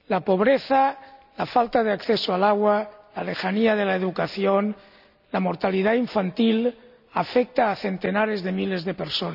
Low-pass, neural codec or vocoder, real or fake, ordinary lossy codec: 5.4 kHz; none; real; none